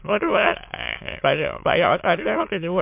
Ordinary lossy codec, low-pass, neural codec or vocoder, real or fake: MP3, 32 kbps; 3.6 kHz; autoencoder, 22.05 kHz, a latent of 192 numbers a frame, VITS, trained on many speakers; fake